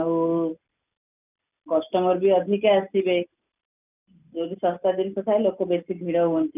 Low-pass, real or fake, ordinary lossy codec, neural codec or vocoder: 3.6 kHz; real; none; none